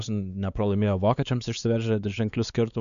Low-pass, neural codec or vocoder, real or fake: 7.2 kHz; codec, 16 kHz, 4 kbps, X-Codec, WavLM features, trained on Multilingual LibriSpeech; fake